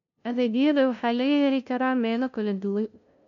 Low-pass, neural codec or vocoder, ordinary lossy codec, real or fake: 7.2 kHz; codec, 16 kHz, 0.5 kbps, FunCodec, trained on LibriTTS, 25 frames a second; none; fake